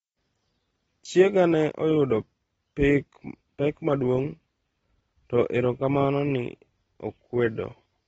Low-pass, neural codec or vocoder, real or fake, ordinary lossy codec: 9.9 kHz; none; real; AAC, 24 kbps